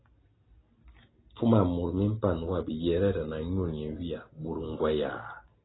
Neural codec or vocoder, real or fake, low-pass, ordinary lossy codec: none; real; 7.2 kHz; AAC, 16 kbps